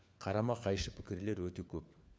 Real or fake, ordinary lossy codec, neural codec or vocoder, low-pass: fake; none; codec, 16 kHz, 4 kbps, FunCodec, trained on LibriTTS, 50 frames a second; none